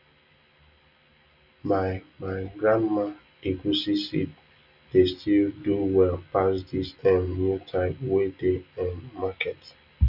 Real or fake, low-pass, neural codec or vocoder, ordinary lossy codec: real; 5.4 kHz; none; none